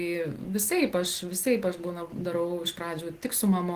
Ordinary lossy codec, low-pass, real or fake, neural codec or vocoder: Opus, 16 kbps; 14.4 kHz; real; none